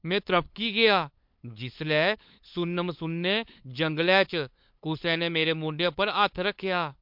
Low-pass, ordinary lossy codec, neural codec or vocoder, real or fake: 5.4 kHz; MP3, 48 kbps; codec, 16 kHz, 8 kbps, FunCodec, trained on LibriTTS, 25 frames a second; fake